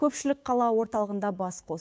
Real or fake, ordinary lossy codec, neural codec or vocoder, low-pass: fake; none; codec, 16 kHz, 6 kbps, DAC; none